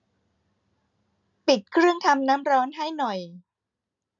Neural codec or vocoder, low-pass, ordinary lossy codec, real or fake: none; 7.2 kHz; none; real